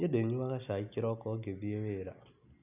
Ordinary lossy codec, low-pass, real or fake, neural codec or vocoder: none; 3.6 kHz; real; none